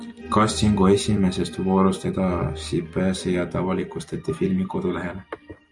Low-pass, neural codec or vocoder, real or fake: 10.8 kHz; vocoder, 44.1 kHz, 128 mel bands every 256 samples, BigVGAN v2; fake